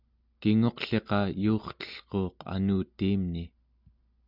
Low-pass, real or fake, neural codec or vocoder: 5.4 kHz; real; none